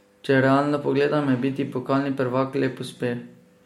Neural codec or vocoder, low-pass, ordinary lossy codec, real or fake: none; 19.8 kHz; MP3, 64 kbps; real